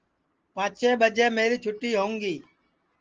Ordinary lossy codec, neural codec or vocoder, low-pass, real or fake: Opus, 16 kbps; none; 7.2 kHz; real